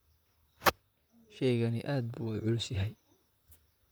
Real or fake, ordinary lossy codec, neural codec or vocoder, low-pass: fake; none; vocoder, 44.1 kHz, 128 mel bands, Pupu-Vocoder; none